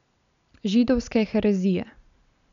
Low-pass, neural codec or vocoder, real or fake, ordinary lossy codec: 7.2 kHz; none; real; none